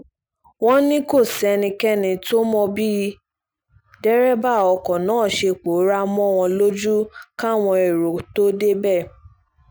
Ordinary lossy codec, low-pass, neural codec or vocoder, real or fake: none; none; none; real